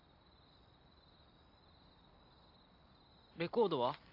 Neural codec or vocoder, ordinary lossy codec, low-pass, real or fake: vocoder, 44.1 kHz, 128 mel bands every 256 samples, BigVGAN v2; AAC, 32 kbps; 5.4 kHz; fake